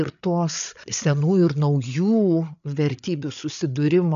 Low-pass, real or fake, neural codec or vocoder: 7.2 kHz; fake; codec, 16 kHz, 8 kbps, FreqCodec, larger model